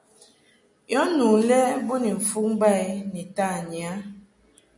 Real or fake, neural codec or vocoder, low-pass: real; none; 10.8 kHz